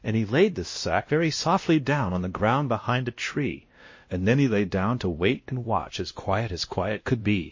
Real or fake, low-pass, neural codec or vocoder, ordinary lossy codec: fake; 7.2 kHz; codec, 16 kHz, 1 kbps, X-Codec, WavLM features, trained on Multilingual LibriSpeech; MP3, 32 kbps